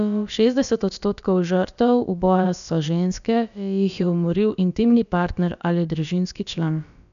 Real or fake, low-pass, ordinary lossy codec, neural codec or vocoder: fake; 7.2 kHz; none; codec, 16 kHz, about 1 kbps, DyCAST, with the encoder's durations